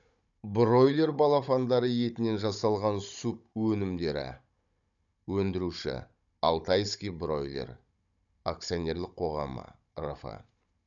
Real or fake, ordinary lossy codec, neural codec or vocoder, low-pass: fake; none; codec, 16 kHz, 16 kbps, FunCodec, trained on Chinese and English, 50 frames a second; 7.2 kHz